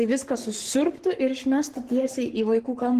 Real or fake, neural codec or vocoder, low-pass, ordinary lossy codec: fake; codec, 44.1 kHz, 7.8 kbps, Pupu-Codec; 14.4 kHz; Opus, 16 kbps